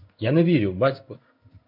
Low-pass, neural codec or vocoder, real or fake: 5.4 kHz; codec, 16 kHz in and 24 kHz out, 1 kbps, XY-Tokenizer; fake